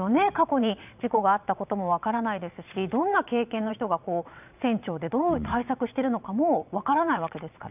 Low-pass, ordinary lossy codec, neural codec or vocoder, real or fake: 3.6 kHz; none; vocoder, 22.05 kHz, 80 mel bands, WaveNeXt; fake